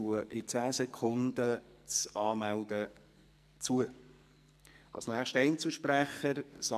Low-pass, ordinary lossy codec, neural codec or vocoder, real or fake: 14.4 kHz; none; codec, 44.1 kHz, 2.6 kbps, SNAC; fake